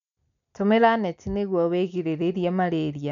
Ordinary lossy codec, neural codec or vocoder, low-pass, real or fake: none; none; 7.2 kHz; real